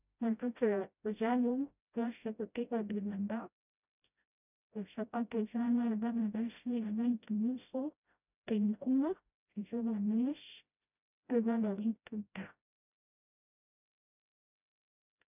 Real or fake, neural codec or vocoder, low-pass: fake; codec, 16 kHz, 0.5 kbps, FreqCodec, smaller model; 3.6 kHz